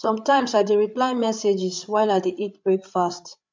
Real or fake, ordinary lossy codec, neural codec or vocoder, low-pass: fake; MP3, 64 kbps; codec, 16 kHz, 8 kbps, FreqCodec, larger model; 7.2 kHz